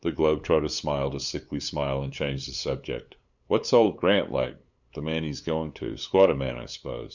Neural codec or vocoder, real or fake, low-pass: codec, 16 kHz, 8 kbps, FunCodec, trained on LibriTTS, 25 frames a second; fake; 7.2 kHz